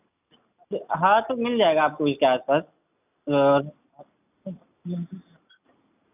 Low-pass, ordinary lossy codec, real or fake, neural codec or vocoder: 3.6 kHz; none; real; none